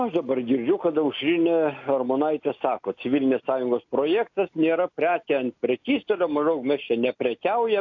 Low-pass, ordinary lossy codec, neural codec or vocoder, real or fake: 7.2 kHz; AAC, 48 kbps; none; real